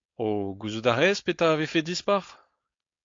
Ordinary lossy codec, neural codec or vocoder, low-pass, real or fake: AAC, 48 kbps; codec, 16 kHz, 4.8 kbps, FACodec; 7.2 kHz; fake